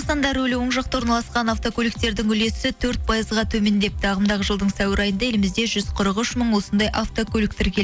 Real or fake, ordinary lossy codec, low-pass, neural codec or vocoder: real; none; none; none